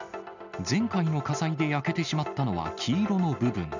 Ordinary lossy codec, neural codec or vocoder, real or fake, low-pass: none; none; real; 7.2 kHz